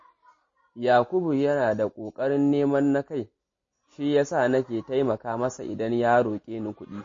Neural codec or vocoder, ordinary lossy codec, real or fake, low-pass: none; MP3, 32 kbps; real; 7.2 kHz